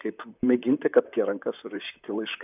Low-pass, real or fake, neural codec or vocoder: 3.6 kHz; fake; vocoder, 44.1 kHz, 128 mel bands, Pupu-Vocoder